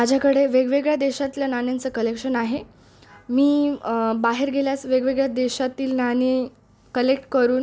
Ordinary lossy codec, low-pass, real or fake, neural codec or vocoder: none; none; real; none